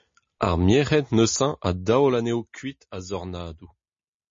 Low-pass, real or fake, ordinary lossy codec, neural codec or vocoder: 7.2 kHz; real; MP3, 32 kbps; none